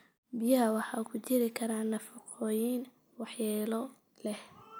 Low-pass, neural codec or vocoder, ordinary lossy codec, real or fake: none; none; none; real